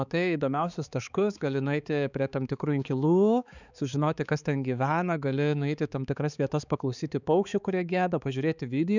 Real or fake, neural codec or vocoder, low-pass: fake; codec, 16 kHz, 4 kbps, X-Codec, HuBERT features, trained on balanced general audio; 7.2 kHz